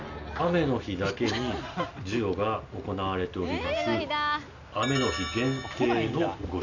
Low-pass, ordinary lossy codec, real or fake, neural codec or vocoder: 7.2 kHz; none; real; none